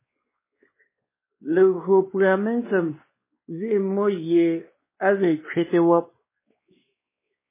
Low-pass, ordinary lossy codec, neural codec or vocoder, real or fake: 3.6 kHz; MP3, 16 kbps; codec, 16 kHz, 2 kbps, X-Codec, WavLM features, trained on Multilingual LibriSpeech; fake